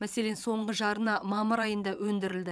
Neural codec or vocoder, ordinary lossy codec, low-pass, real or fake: vocoder, 22.05 kHz, 80 mel bands, WaveNeXt; none; none; fake